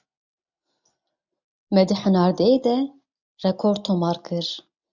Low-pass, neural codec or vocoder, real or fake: 7.2 kHz; none; real